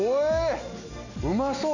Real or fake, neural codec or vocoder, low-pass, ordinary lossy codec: real; none; 7.2 kHz; none